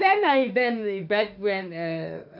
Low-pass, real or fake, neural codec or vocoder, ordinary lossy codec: 5.4 kHz; fake; autoencoder, 48 kHz, 32 numbers a frame, DAC-VAE, trained on Japanese speech; none